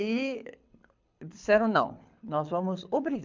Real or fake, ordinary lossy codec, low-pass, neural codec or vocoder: fake; MP3, 64 kbps; 7.2 kHz; codec, 24 kHz, 6 kbps, HILCodec